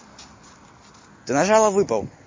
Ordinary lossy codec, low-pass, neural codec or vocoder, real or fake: MP3, 32 kbps; 7.2 kHz; none; real